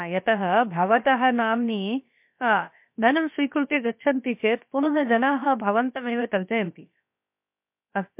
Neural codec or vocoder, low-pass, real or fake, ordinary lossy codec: codec, 16 kHz, about 1 kbps, DyCAST, with the encoder's durations; 3.6 kHz; fake; MP3, 32 kbps